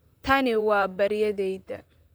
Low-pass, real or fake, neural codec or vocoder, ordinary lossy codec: none; fake; vocoder, 44.1 kHz, 128 mel bands, Pupu-Vocoder; none